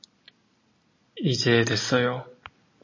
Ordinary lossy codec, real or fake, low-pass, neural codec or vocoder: MP3, 32 kbps; real; 7.2 kHz; none